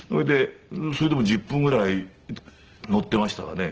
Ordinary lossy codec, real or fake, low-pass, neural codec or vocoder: Opus, 16 kbps; real; 7.2 kHz; none